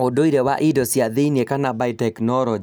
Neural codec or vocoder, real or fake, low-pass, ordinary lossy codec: none; real; none; none